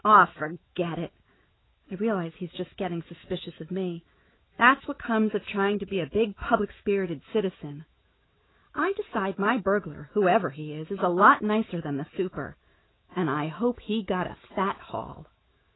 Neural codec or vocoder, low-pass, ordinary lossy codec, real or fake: autoencoder, 48 kHz, 128 numbers a frame, DAC-VAE, trained on Japanese speech; 7.2 kHz; AAC, 16 kbps; fake